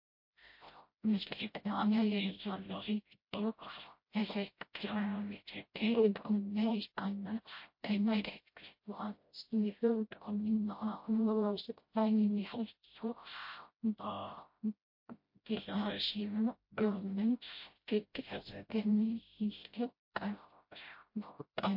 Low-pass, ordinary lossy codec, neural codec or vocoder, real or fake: 5.4 kHz; MP3, 32 kbps; codec, 16 kHz, 0.5 kbps, FreqCodec, smaller model; fake